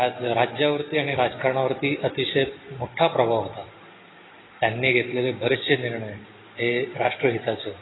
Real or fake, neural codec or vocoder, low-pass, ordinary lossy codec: real; none; 7.2 kHz; AAC, 16 kbps